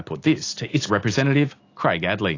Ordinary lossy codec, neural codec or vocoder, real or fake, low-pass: AAC, 32 kbps; none; real; 7.2 kHz